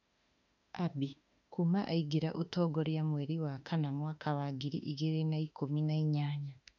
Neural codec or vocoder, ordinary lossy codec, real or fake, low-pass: autoencoder, 48 kHz, 32 numbers a frame, DAC-VAE, trained on Japanese speech; none; fake; 7.2 kHz